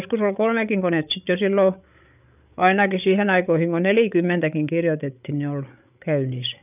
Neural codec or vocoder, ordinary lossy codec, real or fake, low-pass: codec, 16 kHz, 8 kbps, FreqCodec, larger model; none; fake; 3.6 kHz